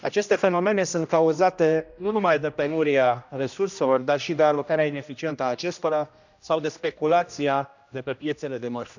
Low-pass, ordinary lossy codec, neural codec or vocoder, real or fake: 7.2 kHz; none; codec, 16 kHz, 1 kbps, X-Codec, HuBERT features, trained on general audio; fake